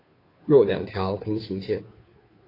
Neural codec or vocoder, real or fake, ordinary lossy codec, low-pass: codec, 16 kHz, 2 kbps, FunCodec, trained on Chinese and English, 25 frames a second; fake; AAC, 24 kbps; 5.4 kHz